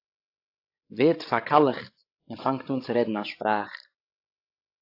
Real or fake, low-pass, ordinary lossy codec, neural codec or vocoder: fake; 5.4 kHz; AAC, 32 kbps; codec, 16 kHz, 16 kbps, FreqCodec, larger model